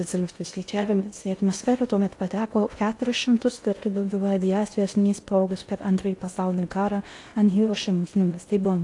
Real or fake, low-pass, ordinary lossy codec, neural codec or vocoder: fake; 10.8 kHz; AAC, 48 kbps; codec, 16 kHz in and 24 kHz out, 0.6 kbps, FocalCodec, streaming, 2048 codes